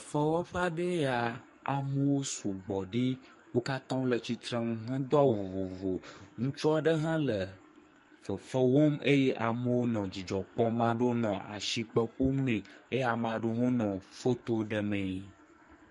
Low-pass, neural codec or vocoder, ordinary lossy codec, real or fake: 14.4 kHz; codec, 44.1 kHz, 2.6 kbps, SNAC; MP3, 48 kbps; fake